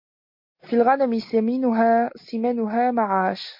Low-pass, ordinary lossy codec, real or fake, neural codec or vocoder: 5.4 kHz; MP3, 32 kbps; real; none